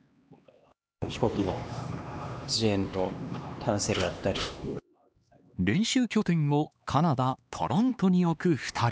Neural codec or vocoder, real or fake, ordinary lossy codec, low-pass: codec, 16 kHz, 2 kbps, X-Codec, HuBERT features, trained on LibriSpeech; fake; none; none